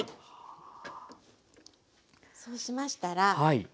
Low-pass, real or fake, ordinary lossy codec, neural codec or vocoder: none; real; none; none